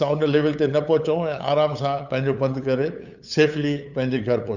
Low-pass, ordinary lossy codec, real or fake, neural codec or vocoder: 7.2 kHz; none; fake; codec, 16 kHz, 8 kbps, FunCodec, trained on LibriTTS, 25 frames a second